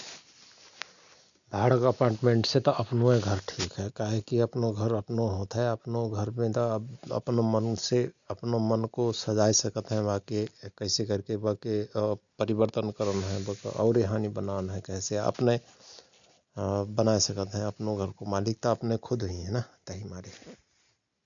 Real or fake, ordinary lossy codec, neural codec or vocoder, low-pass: real; none; none; 7.2 kHz